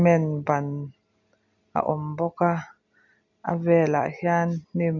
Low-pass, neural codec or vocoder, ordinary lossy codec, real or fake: 7.2 kHz; none; none; real